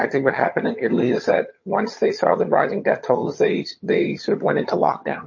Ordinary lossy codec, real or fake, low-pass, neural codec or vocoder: MP3, 32 kbps; fake; 7.2 kHz; vocoder, 22.05 kHz, 80 mel bands, HiFi-GAN